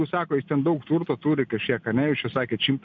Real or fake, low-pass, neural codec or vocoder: real; 7.2 kHz; none